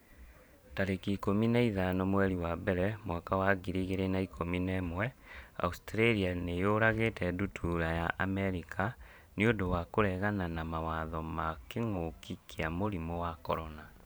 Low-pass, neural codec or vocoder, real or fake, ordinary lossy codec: none; vocoder, 44.1 kHz, 128 mel bands every 512 samples, BigVGAN v2; fake; none